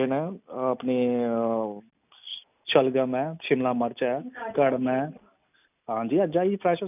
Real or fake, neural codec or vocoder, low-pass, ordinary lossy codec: real; none; 3.6 kHz; none